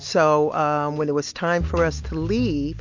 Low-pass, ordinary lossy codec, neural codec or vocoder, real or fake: 7.2 kHz; MP3, 48 kbps; none; real